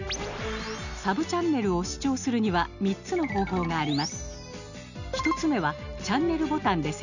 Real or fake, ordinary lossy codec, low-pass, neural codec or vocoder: real; none; 7.2 kHz; none